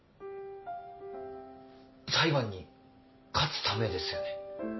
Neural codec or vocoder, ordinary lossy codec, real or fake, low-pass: none; MP3, 24 kbps; real; 7.2 kHz